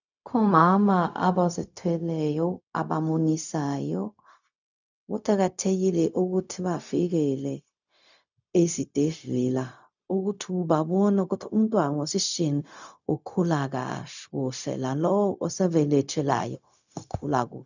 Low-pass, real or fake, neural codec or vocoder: 7.2 kHz; fake; codec, 16 kHz, 0.4 kbps, LongCat-Audio-Codec